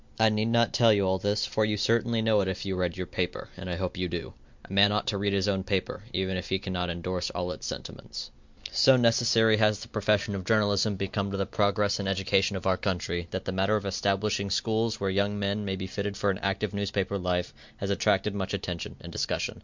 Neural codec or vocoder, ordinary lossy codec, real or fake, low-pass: none; MP3, 64 kbps; real; 7.2 kHz